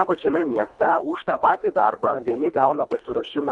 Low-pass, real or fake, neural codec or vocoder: 10.8 kHz; fake; codec, 24 kHz, 1.5 kbps, HILCodec